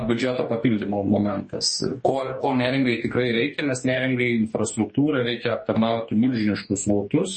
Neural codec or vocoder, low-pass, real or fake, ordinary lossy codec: codec, 44.1 kHz, 2.6 kbps, DAC; 10.8 kHz; fake; MP3, 32 kbps